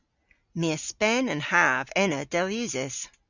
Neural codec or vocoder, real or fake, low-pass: none; real; 7.2 kHz